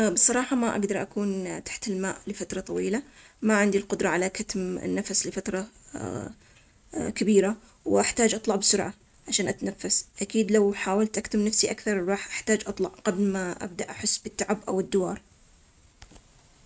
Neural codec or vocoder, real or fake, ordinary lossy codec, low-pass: none; real; none; none